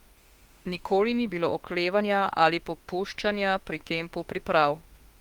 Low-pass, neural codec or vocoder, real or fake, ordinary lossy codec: 19.8 kHz; autoencoder, 48 kHz, 32 numbers a frame, DAC-VAE, trained on Japanese speech; fake; Opus, 24 kbps